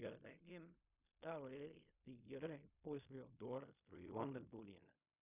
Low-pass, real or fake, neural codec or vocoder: 3.6 kHz; fake; codec, 16 kHz in and 24 kHz out, 0.4 kbps, LongCat-Audio-Codec, fine tuned four codebook decoder